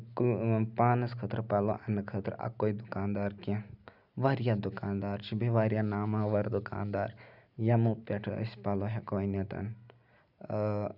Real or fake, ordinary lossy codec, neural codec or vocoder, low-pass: real; none; none; 5.4 kHz